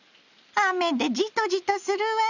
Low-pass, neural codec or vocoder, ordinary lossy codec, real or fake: 7.2 kHz; none; none; real